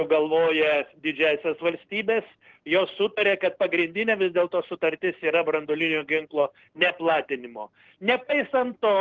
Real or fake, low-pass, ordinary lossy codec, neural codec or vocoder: real; 7.2 kHz; Opus, 16 kbps; none